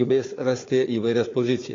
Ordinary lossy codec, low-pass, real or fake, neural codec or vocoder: AAC, 32 kbps; 7.2 kHz; fake; codec, 16 kHz, 4 kbps, FunCodec, trained on Chinese and English, 50 frames a second